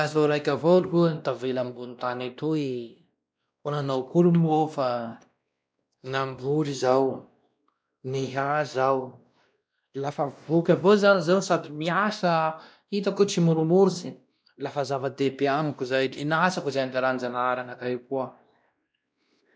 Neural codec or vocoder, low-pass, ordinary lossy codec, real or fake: codec, 16 kHz, 1 kbps, X-Codec, WavLM features, trained on Multilingual LibriSpeech; none; none; fake